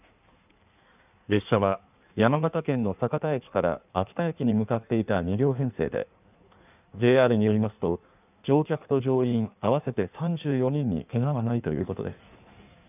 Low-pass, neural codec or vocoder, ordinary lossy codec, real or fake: 3.6 kHz; codec, 16 kHz in and 24 kHz out, 1.1 kbps, FireRedTTS-2 codec; none; fake